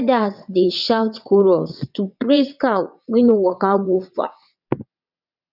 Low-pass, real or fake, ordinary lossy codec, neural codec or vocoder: 5.4 kHz; fake; none; vocoder, 22.05 kHz, 80 mel bands, WaveNeXt